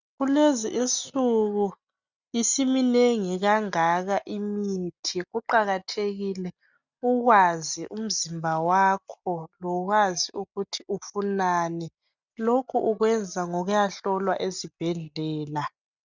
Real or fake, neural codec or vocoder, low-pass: real; none; 7.2 kHz